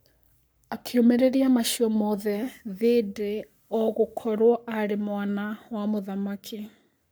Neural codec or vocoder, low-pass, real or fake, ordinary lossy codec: codec, 44.1 kHz, 7.8 kbps, Pupu-Codec; none; fake; none